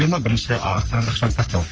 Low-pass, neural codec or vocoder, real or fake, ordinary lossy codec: 7.2 kHz; codec, 44.1 kHz, 3.4 kbps, Pupu-Codec; fake; Opus, 24 kbps